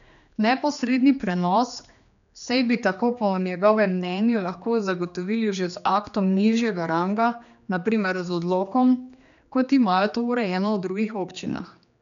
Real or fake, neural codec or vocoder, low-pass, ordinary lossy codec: fake; codec, 16 kHz, 2 kbps, X-Codec, HuBERT features, trained on general audio; 7.2 kHz; none